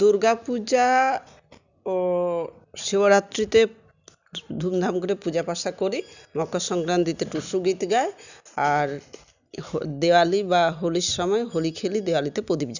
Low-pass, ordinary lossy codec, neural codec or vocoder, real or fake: 7.2 kHz; none; none; real